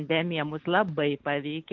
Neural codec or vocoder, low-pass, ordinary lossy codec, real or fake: none; 7.2 kHz; Opus, 32 kbps; real